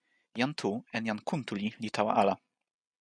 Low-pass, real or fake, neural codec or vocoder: 9.9 kHz; real; none